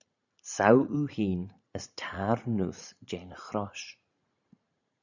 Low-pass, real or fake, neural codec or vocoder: 7.2 kHz; fake; vocoder, 44.1 kHz, 80 mel bands, Vocos